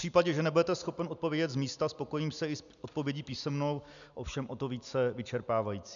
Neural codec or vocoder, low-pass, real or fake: none; 7.2 kHz; real